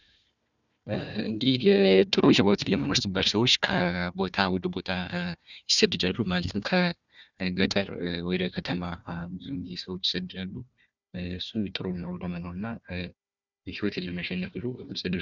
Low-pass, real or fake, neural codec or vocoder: 7.2 kHz; fake; codec, 16 kHz, 1 kbps, FunCodec, trained on Chinese and English, 50 frames a second